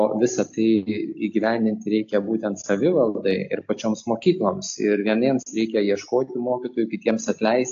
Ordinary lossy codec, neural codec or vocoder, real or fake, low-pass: AAC, 48 kbps; none; real; 7.2 kHz